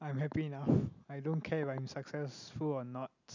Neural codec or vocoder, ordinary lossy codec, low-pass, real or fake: none; none; 7.2 kHz; real